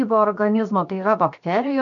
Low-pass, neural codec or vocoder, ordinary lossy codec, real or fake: 7.2 kHz; codec, 16 kHz, 0.7 kbps, FocalCodec; MP3, 48 kbps; fake